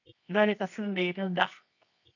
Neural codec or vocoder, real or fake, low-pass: codec, 24 kHz, 0.9 kbps, WavTokenizer, medium music audio release; fake; 7.2 kHz